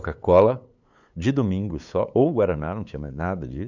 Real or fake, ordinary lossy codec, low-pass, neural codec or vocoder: fake; none; 7.2 kHz; codec, 16 kHz, 8 kbps, FunCodec, trained on LibriTTS, 25 frames a second